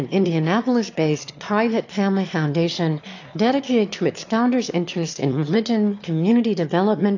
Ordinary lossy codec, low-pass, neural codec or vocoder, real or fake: AAC, 48 kbps; 7.2 kHz; autoencoder, 22.05 kHz, a latent of 192 numbers a frame, VITS, trained on one speaker; fake